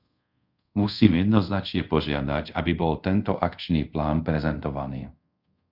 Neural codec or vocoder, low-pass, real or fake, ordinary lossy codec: codec, 24 kHz, 0.5 kbps, DualCodec; 5.4 kHz; fake; Opus, 64 kbps